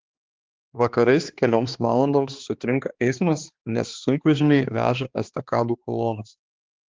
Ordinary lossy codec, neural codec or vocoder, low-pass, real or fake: Opus, 16 kbps; codec, 16 kHz, 2 kbps, X-Codec, HuBERT features, trained on balanced general audio; 7.2 kHz; fake